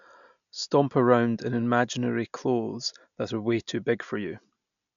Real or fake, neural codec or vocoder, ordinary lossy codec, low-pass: real; none; none; 7.2 kHz